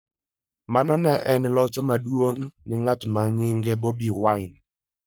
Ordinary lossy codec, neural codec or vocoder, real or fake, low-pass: none; codec, 44.1 kHz, 3.4 kbps, Pupu-Codec; fake; none